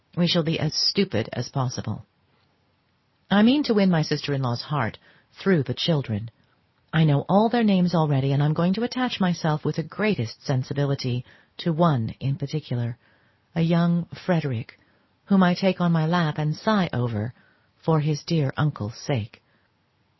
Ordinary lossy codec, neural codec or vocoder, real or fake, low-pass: MP3, 24 kbps; none; real; 7.2 kHz